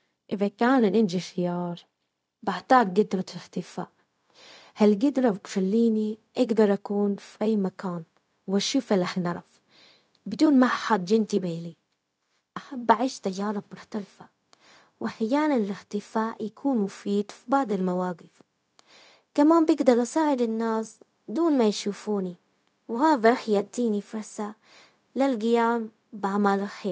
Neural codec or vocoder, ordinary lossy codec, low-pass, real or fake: codec, 16 kHz, 0.4 kbps, LongCat-Audio-Codec; none; none; fake